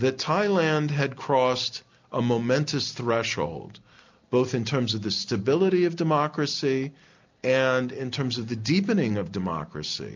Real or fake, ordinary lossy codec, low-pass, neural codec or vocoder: real; MP3, 64 kbps; 7.2 kHz; none